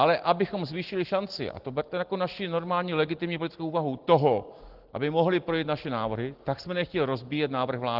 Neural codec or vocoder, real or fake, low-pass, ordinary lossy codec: none; real; 5.4 kHz; Opus, 16 kbps